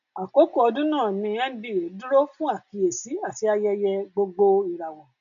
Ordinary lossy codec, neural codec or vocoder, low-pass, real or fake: none; none; 7.2 kHz; real